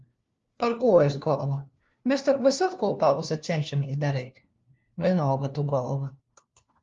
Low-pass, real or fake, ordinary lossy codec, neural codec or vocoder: 7.2 kHz; fake; Opus, 32 kbps; codec, 16 kHz, 1 kbps, FunCodec, trained on LibriTTS, 50 frames a second